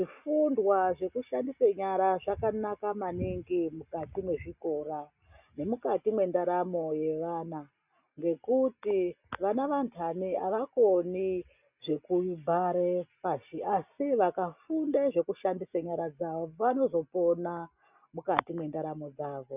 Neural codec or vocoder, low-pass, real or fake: none; 3.6 kHz; real